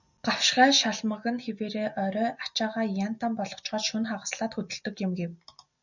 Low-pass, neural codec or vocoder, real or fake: 7.2 kHz; none; real